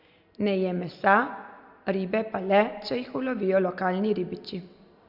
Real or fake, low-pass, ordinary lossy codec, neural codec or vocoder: real; 5.4 kHz; Opus, 64 kbps; none